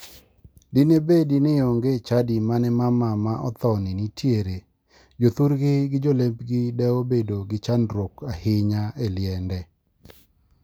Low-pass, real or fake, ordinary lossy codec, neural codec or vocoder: none; real; none; none